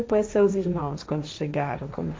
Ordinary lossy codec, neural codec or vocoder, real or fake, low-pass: none; codec, 16 kHz, 1.1 kbps, Voila-Tokenizer; fake; none